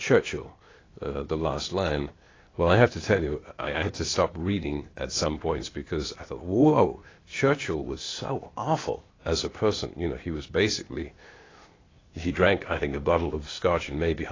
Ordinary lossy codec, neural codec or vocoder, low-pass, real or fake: AAC, 32 kbps; codec, 16 kHz, 0.8 kbps, ZipCodec; 7.2 kHz; fake